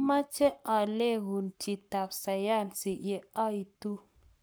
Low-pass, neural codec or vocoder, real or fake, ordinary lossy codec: none; codec, 44.1 kHz, 7.8 kbps, Pupu-Codec; fake; none